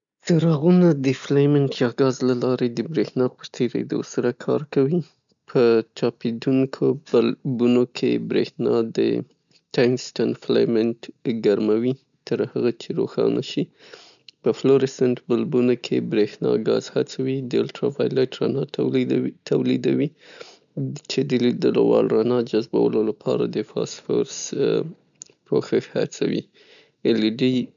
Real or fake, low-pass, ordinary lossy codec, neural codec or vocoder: real; 7.2 kHz; none; none